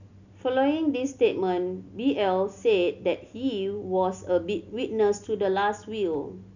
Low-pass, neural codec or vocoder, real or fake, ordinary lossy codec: 7.2 kHz; none; real; none